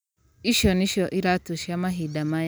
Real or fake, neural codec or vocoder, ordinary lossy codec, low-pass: real; none; none; none